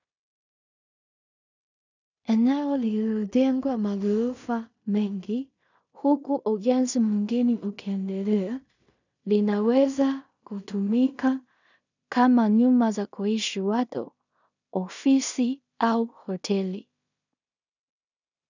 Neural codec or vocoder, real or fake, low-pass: codec, 16 kHz in and 24 kHz out, 0.4 kbps, LongCat-Audio-Codec, two codebook decoder; fake; 7.2 kHz